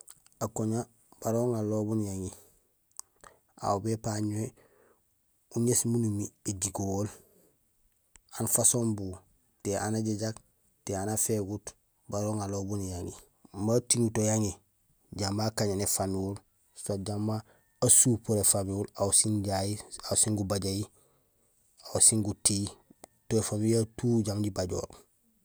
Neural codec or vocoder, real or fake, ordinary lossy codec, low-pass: none; real; none; none